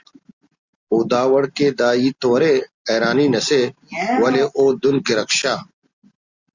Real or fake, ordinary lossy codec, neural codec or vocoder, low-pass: real; Opus, 64 kbps; none; 7.2 kHz